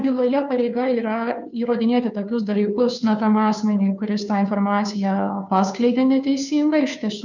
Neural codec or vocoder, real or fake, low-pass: codec, 16 kHz, 2 kbps, FunCodec, trained on Chinese and English, 25 frames a second; fake; 7.2 kHz